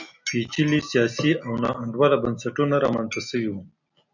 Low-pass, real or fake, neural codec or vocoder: 7.2 kHz; real; none